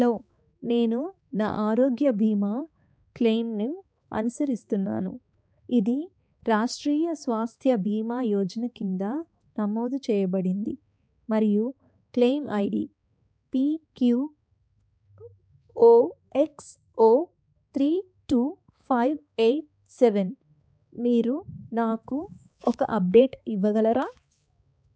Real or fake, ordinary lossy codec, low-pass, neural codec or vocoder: fake; none; none; codec, 16 kHz, 4 kbps, X-Codec, HuBERT features, trained on balanced general audio